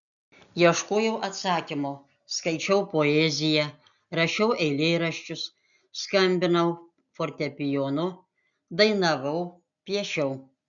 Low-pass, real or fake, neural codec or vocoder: 7.2 kHz; real; none